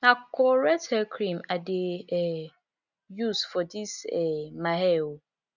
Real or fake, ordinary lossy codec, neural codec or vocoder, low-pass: real; none; none; 7.2 kHz